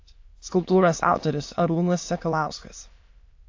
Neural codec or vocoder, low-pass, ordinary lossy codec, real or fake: autoencoder, 22.05 kHz, a latent of 192 numbers a frame, VITS, trained on many speakers; 7.2 kHz; AAC, 48 kbps; fake